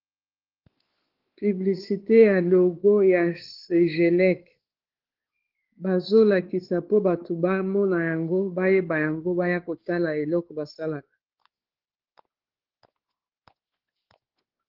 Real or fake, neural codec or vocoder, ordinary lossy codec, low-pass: fake; codec, 16 kHz, 4 kbps, X-Codec, WavLM features, trained on Multilingual LibriSpeech; Opus, 16 kbps; 5.4 kHz